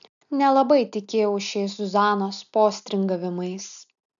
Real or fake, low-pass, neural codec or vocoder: real; 7.2 kHz; none